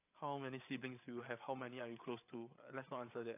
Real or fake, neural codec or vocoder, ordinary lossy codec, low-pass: fake; codec, 16 kHz, 8 kbps, FreqCodec, larger model; AAC, 24 kbps; 3.6 kHz